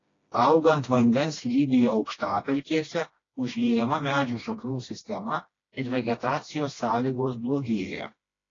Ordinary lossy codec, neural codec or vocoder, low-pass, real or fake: AAC, 32 kbps; codec, 16 kHz, 1 kbps, FreqCodec, smaller model; 7.2 kHz; fake